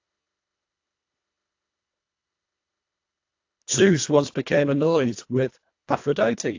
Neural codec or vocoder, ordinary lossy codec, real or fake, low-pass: codec, 24 kHz, 1.5 kbps, HILCodec; AAC, 48 kbps; fake; 7.2 kHz